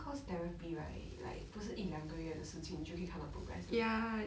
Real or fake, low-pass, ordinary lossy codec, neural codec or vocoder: real; none; none; none